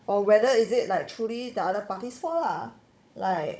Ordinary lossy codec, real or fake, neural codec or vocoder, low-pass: none; fake; codec, 16 kHz, 16 kbps, FunCodec, trained on Chinese and English, 50 frames a second; none